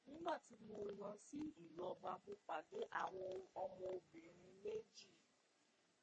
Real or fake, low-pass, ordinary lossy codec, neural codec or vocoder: fake; 9.9 kHz; MP3, 32 kbps; codec, 44.1 kHz, 3.4 kbps, Pupu-Codec